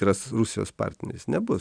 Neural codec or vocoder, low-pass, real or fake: none; 9.9 kHz; real